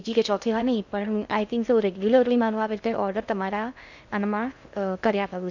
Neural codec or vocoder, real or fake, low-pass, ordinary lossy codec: codec, 16 kHz in and 24 kHz out, 0.6 kbps, FocalCodec, streaming, 2048 codes; fake; 7.2 kHz; none